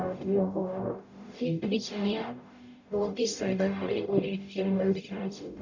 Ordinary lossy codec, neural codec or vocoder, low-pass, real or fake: none; codec, 44.1 kHz, 0.9 kbps, DAC; 7.2 kHz; fake